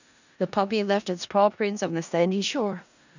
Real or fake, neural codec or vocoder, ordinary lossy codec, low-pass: fake; codec, 16 kHz in and 24 kHz out, 0.4 kbps, LongCat-Audio-Codec, four codebook decoder; none; 7.2 kHz